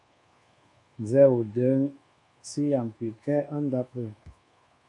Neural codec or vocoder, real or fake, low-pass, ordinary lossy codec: codec, 24 kHz, 1.2 kbps, DualCodec; fake; 10.8 kHz; MP3, 48 kbps